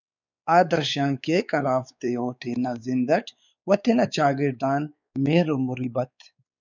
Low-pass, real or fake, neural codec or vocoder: 7.2 kHz; fake; codec, 16 kHz, 4 kbps, X-Codec, WavLM features, trained on Multilingual LibriSpeech